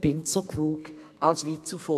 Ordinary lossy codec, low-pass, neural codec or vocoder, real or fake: none; 14.4 kHz; codec, 32 kHz, 1.9 kbps, SNAC; fake